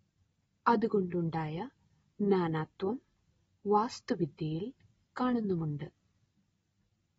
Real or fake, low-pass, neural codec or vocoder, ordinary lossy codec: real; 19.8 kHz; none; AAC, 24 kbps